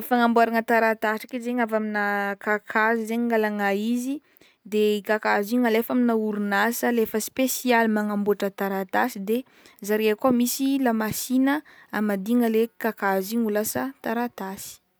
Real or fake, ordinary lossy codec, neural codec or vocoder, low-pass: real; none; none; none